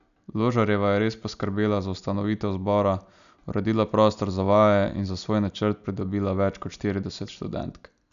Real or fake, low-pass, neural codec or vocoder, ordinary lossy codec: real; 7.2 kHz; none; none